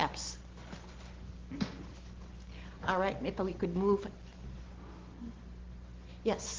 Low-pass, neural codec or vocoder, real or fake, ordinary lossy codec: 7.2 kHz; none; real; Opus, 16 kbps